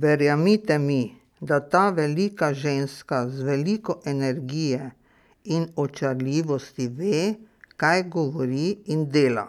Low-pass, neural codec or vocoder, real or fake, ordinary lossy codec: 19.8 kHz; none; real; none